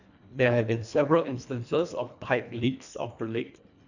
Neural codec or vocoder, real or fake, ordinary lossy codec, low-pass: codec, 24 kHz, 1.5 kbps, HILCodec; fake; none; 7.2 kHz